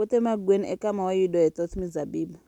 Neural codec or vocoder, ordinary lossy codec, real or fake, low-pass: none; none; real; 19.8 kHz